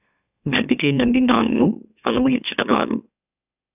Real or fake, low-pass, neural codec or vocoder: fake; 3.6 kHz; autoencoder, 44.1 kHz, a latent of 192 numbers a frame, MeloTTS